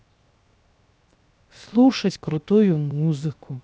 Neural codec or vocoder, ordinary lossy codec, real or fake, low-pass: codec, 16 kHz, 0.7 kbps, FocalCodec; none; fake; none